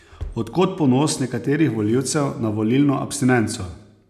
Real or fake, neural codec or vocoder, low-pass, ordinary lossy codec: real; none; 14.4 kHz; none